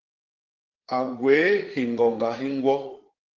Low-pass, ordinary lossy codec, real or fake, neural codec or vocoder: 7.2 kHz; Opus, 16 kbps; fake; codec, 16 kHz, 8 kbps, FreqCodec, larger model